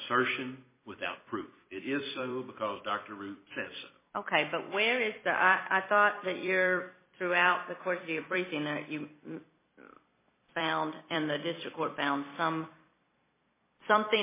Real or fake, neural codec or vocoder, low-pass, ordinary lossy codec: fake; vocoder, 44.1 kHz, 128 mel bands every 256 samples, BigVGAN v2; 3.6 kHz; MP3, 16 kbps